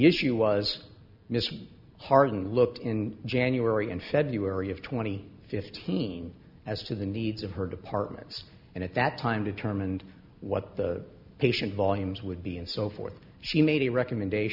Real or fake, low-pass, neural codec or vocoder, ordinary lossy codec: real; 5.4 kHz; none; Opus, 64 kbps